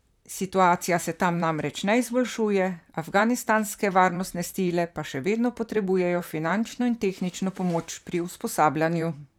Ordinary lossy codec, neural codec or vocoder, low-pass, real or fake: none; vocoder, 44.1 kHz, 128 mel bands, Pupu-Vocoder; 19.8 kHz; fake